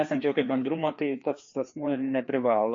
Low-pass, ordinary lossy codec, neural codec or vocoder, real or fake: 7.2 kHz; MP3, 48 kbps; codec, 16 kHz, 2 kbps, FreqCodec, larger model; fake